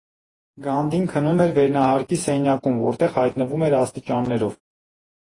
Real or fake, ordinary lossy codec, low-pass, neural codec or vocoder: fake; AAC, 32 kbps; 10.8 kHz; vocoder, 48 kHz, 128 mel bands, Vocos